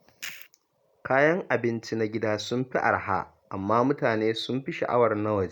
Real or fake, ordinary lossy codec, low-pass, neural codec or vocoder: real; none; 19.8 kHz; none